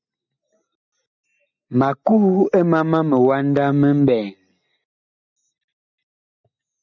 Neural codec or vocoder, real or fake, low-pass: none; real; 7.2 kHz